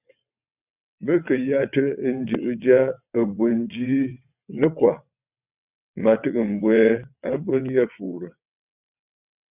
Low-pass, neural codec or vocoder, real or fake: 3.6 kHz; vocoder, 22.05 kHz, 80 mel bands, WaveNeXt; fake